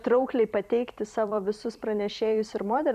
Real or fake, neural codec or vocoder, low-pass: fake; vocoder, 44.1 kHz, 128 mel bands every 256 samples, BigVGAN v2; 14.4 kHz